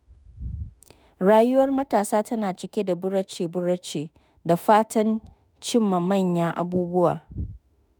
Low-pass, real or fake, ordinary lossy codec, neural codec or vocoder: none; fake; none; autoencoder, 48 kHz, 32 numbers a frame, DAC-VAE, trained on Japanese speech